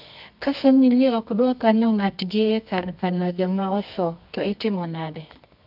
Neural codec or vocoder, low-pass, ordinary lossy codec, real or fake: codec, 24 kHz, 0.9 kbps, WavTokenizer, medium music audio release; 5.4 kHz; none; fake